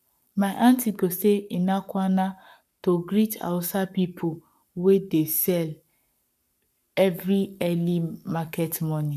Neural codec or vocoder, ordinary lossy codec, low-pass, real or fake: codec, 44.1 kHz, 7.8 kbps, Pupu-Codec; none; 14.4 kHz; fake